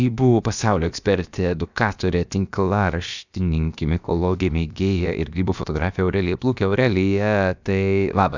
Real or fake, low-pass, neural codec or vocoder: fake; 7.2 kHz; codec, 16 kHz, about 1 kbps, DyCAST, with the encoder's durations